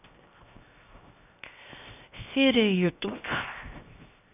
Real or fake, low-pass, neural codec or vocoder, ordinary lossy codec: fake; 3.6 kHz; codec, 16 kHz, 0.7 kbps, FocalCodec; none